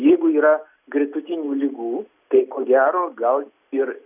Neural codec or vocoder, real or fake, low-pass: none; real; 3.6 kHz